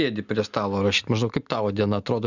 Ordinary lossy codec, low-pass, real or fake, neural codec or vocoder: Opus, 64 kbps; 7.2 kHz; real; none